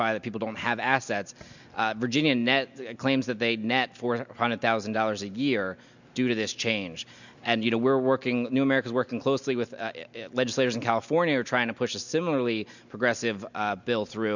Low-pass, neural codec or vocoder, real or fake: 7.2 kHz; none; real